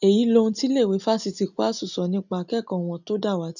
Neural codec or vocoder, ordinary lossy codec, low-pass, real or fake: none; none; 7.2 kHz; real